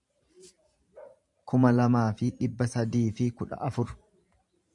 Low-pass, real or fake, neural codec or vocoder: 10.8 kHz; fake; vocoder, 44.1 kHz, 128 mel bands every 512 samples, BigVGAN v2